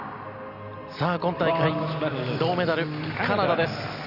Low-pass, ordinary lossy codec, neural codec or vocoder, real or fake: 5.4 kHz; none; none; real